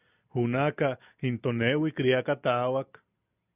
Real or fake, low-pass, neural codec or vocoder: real; 3.6 kHz; none